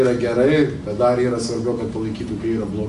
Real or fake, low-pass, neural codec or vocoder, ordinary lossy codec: real; 10.8 kHz; none; AAC, 64 kbps